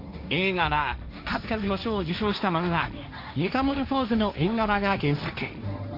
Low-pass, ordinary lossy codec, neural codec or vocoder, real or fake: 5.4 kHz; AAC, 48 kbps; codec, 16 kHz, 1.1 kbps, Voila-Tokenizer; fake